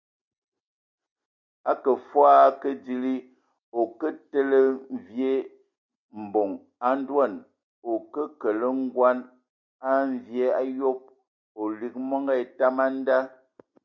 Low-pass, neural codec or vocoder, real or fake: 7.2 kHz; none; real